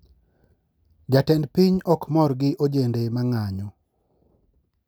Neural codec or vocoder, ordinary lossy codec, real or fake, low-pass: none; none; real; none